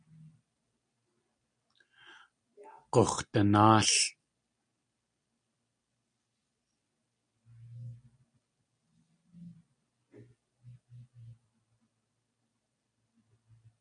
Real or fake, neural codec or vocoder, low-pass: real; none; 9.9 kHz